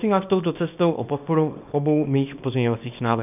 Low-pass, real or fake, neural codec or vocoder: 3.6 kHz; fake; codec, 24 kHz, 0.9 kbps, WavTokenizer, small release